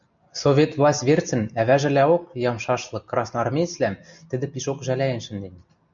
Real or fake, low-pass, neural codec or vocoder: real; 7.2 kHz; none